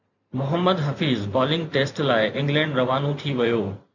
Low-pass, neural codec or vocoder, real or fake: 7.2 kHz; vocoder, 44.1 kHz, 128 mel bands every 512 samples, BigVGAN v2; fake